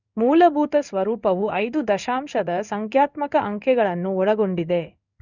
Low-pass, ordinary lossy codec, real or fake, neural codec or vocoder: 7.2 kHz; none; fake; codec, 16 kHz in and 24 kHz out, 1 kbps, XY-Tokenizer